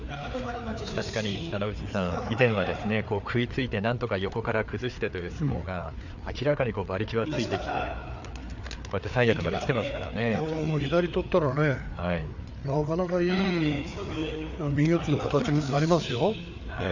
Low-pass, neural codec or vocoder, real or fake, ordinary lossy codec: 7.2 kHz; codec, 16 kHz, 4 kbps, FreqCodec, larger model; fake; none